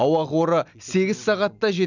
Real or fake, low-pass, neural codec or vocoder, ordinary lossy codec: real; 7.2 kHz; none; none